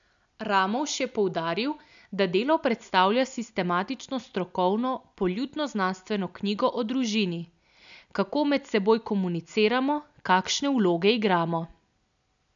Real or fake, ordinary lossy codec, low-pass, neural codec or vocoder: real; none; 7.2 kHz; none